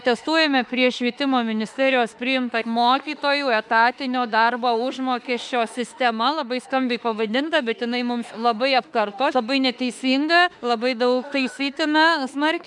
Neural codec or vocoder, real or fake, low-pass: autoencoder, 48 kHz, 32 numbers a frame, DAC-VAE, trained on Japanese speech; fake; 10.8 kHz